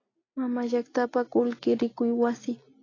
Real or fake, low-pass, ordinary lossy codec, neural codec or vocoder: real; 7.2 kHz; AAC, 32 kbps; none